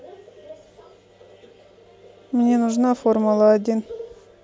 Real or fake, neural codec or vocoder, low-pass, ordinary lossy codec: real; none; none; none